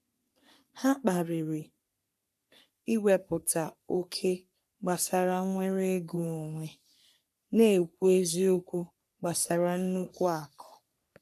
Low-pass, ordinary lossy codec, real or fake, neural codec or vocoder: 14.4 kHz; none; fake; codec, 44.1 kHz, 3.4 kbps, Pupu-Codec